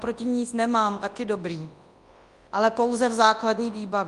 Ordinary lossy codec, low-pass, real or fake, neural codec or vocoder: Opus, 32 kbps; 10.8 kHz; fake; codec, 24 kHz, 0.9 kbps, WavTokenizer, large speech release